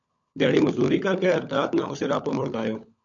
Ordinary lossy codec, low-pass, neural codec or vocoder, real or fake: MP3, 48 kbps; 7.2 kHz; codec, 16 kHz, 16 kbps, FunCodec, trained on LibriTTS, 50 frames a second; fake